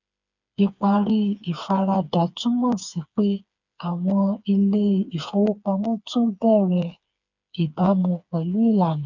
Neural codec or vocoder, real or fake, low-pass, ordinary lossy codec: codec, 16 kHz, 4 kbps, FreqCodec, smaller model; fake; 7.2 kHz; none